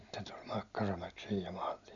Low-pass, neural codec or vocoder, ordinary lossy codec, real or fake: 7.2 kHz; none; none; real